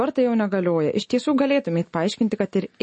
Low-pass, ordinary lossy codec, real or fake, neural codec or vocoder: 10.8 kHz; MP3, 32 kbps; real; none